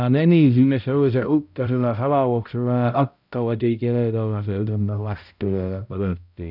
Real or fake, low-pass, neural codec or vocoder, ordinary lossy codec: fake; 5.4 kHz; codec, 16 kHz, 0.5 kbps, X-Codec, HuBERT features, trained on balanced general audio; none